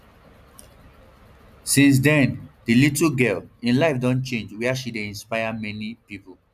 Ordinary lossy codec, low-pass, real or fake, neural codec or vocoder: none; 14.4 kHz; real; none